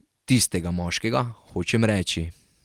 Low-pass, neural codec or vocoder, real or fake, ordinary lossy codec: 19.8 kHz; none; real; Opus, 24 kbps